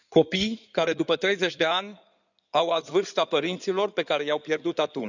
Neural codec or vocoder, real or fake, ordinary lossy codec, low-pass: codec, 16 kHz in and 24 kHz out, 2.2 kbps, FireRedTTS-2 codec; fake; none; 7.2 kHz